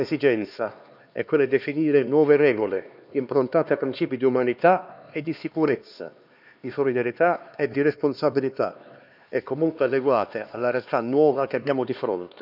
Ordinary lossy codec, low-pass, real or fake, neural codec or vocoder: none; 5.4 kHz; fake; codec, 16 kHz, 2 kbps, X-Codec, HuBERT features, trained on LibriSpeech